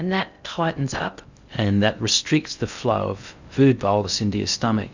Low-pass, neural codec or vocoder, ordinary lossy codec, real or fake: 7.2 kHz; codec, 16 kHz in and 24 kHz out, 0.6 kbps, FocalCodec, streaming, 2048 codes; Opus, 64 kbps; fake